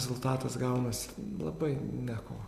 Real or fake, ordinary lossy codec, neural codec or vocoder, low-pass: real; Opus, 64 kbps; none; 14.4 kHz